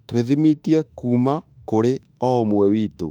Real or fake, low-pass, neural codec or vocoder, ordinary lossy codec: fake; 19.8 kHz; autoencoder, 48 kHz, 32 numbers a frame, DAC-VAE, trained on Japanese speech; none